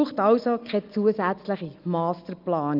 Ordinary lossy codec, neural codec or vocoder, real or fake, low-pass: Opus, 24 kbps; none; real; 5.4 kHz